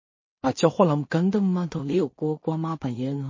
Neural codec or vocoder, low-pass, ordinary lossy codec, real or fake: codec, 16 kHz in and 24 kHz out, 0.4 kbps, LongCat-Audio-Codec, two codebook decoder; 7.2 kHz; MP3, 32 kbps; fake